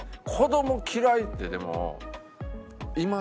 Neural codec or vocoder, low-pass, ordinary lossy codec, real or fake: none; none; none; real